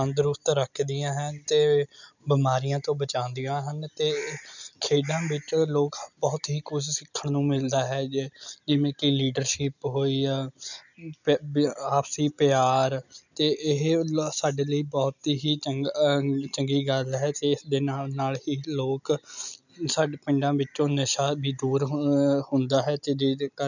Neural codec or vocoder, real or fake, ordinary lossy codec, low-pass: none; real; none; 7.2 kHz